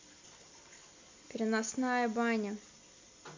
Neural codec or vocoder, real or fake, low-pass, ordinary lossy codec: none; real; 7.2 kHz; MP3, 48 kbps